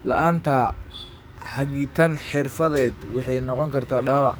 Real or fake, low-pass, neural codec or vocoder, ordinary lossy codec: fake; none; codec, 44.1 kHz, 2.6 kbps, SNAC; none